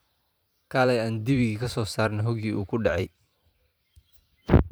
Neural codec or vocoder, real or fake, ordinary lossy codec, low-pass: vocoder, 44.1 kHz, 128 mel bands every 512 samples, BigVGAN v2; fake; none; none